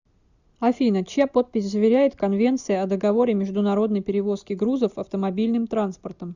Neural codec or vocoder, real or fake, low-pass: none; real; 7.2 kHz